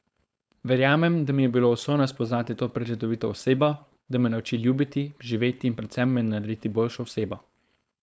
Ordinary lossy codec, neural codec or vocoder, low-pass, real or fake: none; codec, 16 kHz, 4.8 kbps, FACodec; none; fake